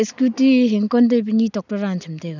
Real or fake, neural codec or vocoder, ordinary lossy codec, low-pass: real; none; none; 7.2 kHz